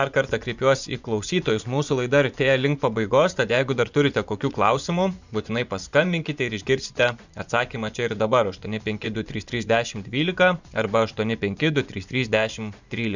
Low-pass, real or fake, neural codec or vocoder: 7.2 kHz; fake; vocoder, 24 kHz, 100 mel bands, Vocos